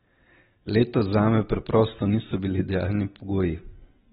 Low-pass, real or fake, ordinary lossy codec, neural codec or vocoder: 19.8 kHz; real; AAC, 16 kbps; none